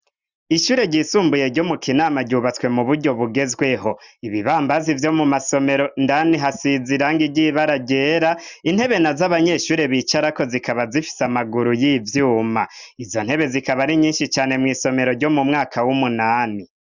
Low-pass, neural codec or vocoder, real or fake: 7.2 kHz; none; real